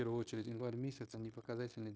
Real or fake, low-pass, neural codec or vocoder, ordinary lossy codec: fake; none; codec, 16 kHz, 0.9 kbps, LongCat-Audio-Codec; none